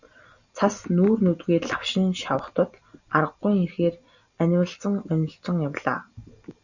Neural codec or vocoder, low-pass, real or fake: none; 7.2 kHz; real